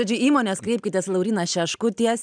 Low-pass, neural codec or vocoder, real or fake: 9.9 kHz; none; real